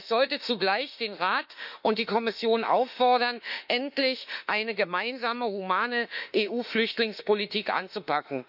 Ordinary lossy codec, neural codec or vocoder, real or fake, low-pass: none; autoencoder, 48 kHz, 32 numbers a frame, DAC-VAE, trained on Japanese speech; fake; 5.4 kHz